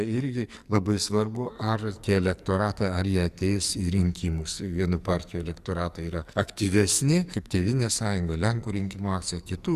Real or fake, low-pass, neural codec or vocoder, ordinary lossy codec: fake; 14.4 kHz; codec, 44.1 kHz, 2.6 kbps, SNAC; Opus, 64 kbps